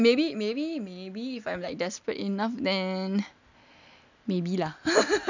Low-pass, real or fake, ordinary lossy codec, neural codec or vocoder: 7.2 kHz; real; none; none